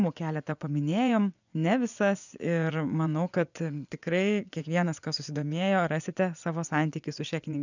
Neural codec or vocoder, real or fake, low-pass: vocoder, 24 kHz, 100 mel bands, Vocos; fake; 7.2 kHz